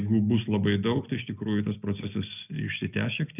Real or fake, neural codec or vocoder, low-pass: real; none; 3.6 kHz